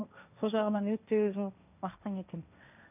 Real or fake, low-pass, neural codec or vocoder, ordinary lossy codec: fake; 3.6 kHz; codec, 16 kHz, 1.1 kbps, Voila-Tokenizer; none